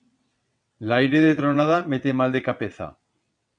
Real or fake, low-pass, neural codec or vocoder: fake; 9.9 kHz; vocoder, 22.05 kHz, 80 mel bands, WaveNeXt